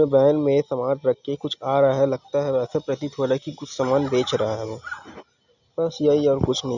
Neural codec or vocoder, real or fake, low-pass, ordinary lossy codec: none; real; 7.2 kHz; none